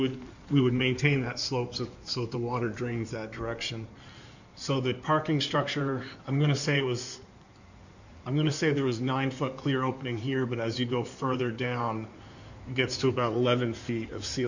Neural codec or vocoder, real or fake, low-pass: codec, 16 kHz in and 24 kHz out, 2.2 kbps, FireRedTTS-2 codec; fake; 7.2 kHz